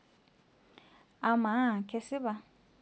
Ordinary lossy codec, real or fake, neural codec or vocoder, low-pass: none; real; none; none